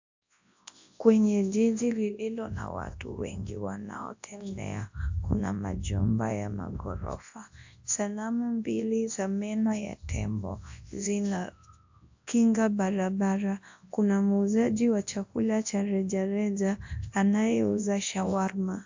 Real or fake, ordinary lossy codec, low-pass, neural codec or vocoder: fake; AAC, 48 kbps; 7.2 kHz; codec, 24 kHz, 0.9 kbps, WavTokenizer, large speech release